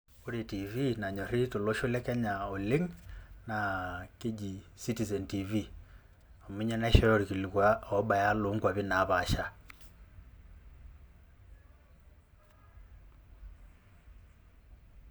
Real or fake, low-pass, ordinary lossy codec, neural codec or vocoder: real; none; none; none